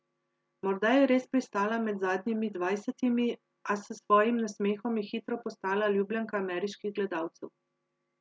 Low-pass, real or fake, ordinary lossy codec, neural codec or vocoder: none; real; none; none